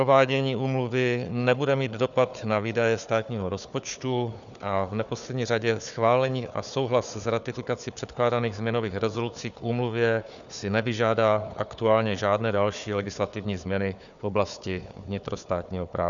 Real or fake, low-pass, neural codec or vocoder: fake; 7.2 kHz; codec, 16 kHz, 4 kbps, FunCodec, trained on Chinese and English, 50 frames a second